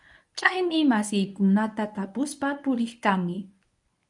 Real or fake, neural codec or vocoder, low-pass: fake; codec, 24 kHz, 0.9 kbps, WavTokenizer, medium speech release version 1; 10.8 kHz